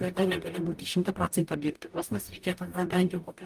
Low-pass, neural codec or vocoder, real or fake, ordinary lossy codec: 14.4 kHz; codec, 44.1 kHz, 0.9 kbps, DAC; fake; Opus, 32 kbps